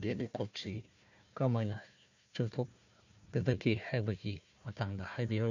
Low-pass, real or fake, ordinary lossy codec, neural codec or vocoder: 7.2 kHz; fake; none; codec, 16 kHz, 1 kbps, FunCodec, trained on Chinese and English, 50 frames a second